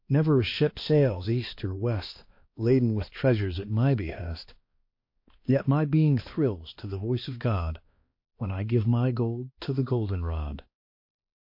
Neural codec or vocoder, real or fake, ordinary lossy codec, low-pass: codec, 16 kHz, 2 kbps, X-Codec, HuBERT features, trained on balanced general audio; fake; MP3, 32 kbps; 5.4 kHz